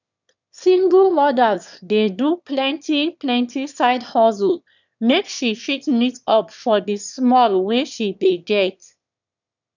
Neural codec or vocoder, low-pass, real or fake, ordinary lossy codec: autoencoder, 22.05 kHz, a latent of 192 numbers a frame, VITS, trained on one speaker; 7.2 kHz; fake; none